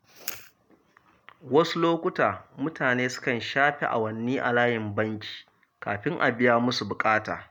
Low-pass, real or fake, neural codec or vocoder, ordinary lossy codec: none; real; none; none